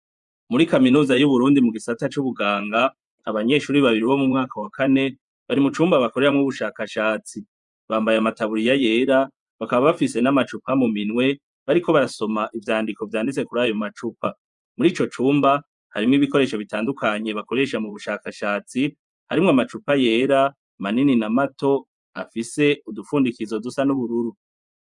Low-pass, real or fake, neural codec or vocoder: 10.8 kHz; fake; vocoder, 24 kHz, 100 mel bands, Vocos